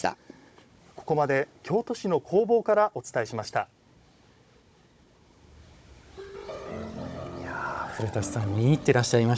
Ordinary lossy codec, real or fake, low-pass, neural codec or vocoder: none; fake; none; codec, 16 kHz, 16 kbps, FunCodec, trained on Chinese and English, 50 frames a second